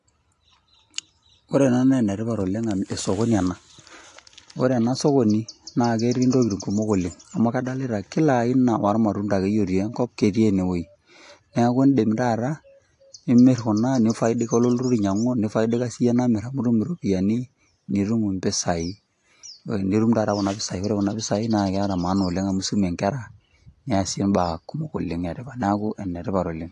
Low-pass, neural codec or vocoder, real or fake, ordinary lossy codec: 10.8 kHz; none; real; AAC, 48 kbps